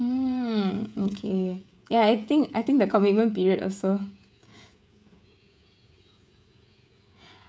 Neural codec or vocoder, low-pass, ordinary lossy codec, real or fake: codec, 16 kHz, 16 kbps, FreqCodec, smaller model; none; none; fake